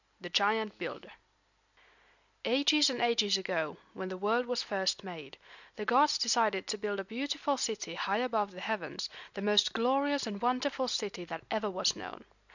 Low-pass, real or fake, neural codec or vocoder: 7.2 kHz; real; none